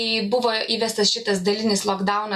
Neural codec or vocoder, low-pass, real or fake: none; 14.4 kHz; real